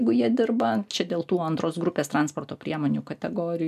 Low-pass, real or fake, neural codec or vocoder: 14.4 kHz; real; none